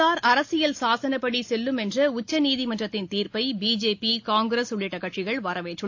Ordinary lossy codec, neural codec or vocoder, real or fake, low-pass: AAC, 48 kbps; none; real; 7.2 kHz